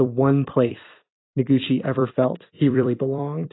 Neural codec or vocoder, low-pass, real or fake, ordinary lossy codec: vocoder, 44.1 kHz, 128 mel bands every 256 samples, BigVGAN v2; 7.2 kHz; fake; AAC, 16 kbps